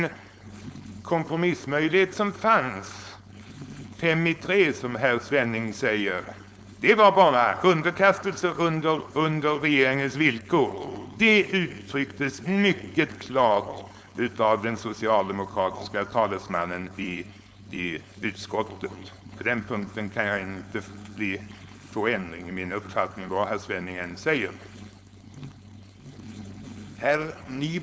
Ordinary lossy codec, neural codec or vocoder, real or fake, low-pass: none; codec, 16 kHz, 4.8 kbps, FACodec; fake; none